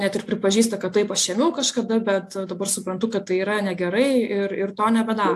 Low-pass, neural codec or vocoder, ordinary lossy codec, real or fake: 14.4 kHz; none; AAC, 64 kbps; real